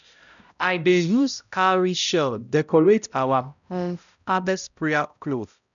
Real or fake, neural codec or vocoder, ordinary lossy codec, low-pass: fake; codec, 16 kHz, 0.5 kbps, X-Codec, HuBERT features, trained on balanced general audio; none; 7.2 kHz